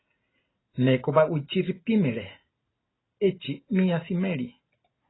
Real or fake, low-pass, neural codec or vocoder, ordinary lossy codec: real; 7.2 kHz; none; AAC, 16 kbps